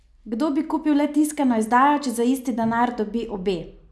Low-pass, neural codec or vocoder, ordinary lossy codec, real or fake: none; none; none; real